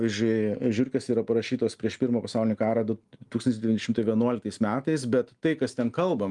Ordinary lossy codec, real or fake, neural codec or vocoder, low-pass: Opus, 32 kbps; real; none; 10.8 kHz